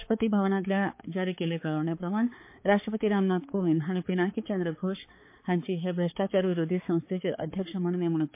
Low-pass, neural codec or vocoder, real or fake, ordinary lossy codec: 3.6 kHz; codec, 16 kHz, 4 kbps, X-Codec, HuBERT features, trained on balanced general audio; fake; MP3, 24 kbps